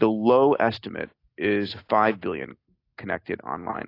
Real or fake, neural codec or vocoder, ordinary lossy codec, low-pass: real; none; AAC, 32 kbps; 5.4 kHz